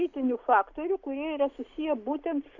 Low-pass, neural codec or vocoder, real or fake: 7.2 kHz; none; real